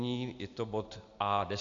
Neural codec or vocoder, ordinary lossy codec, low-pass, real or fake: none; AAC, 64 kbps; 7.2 kHz; real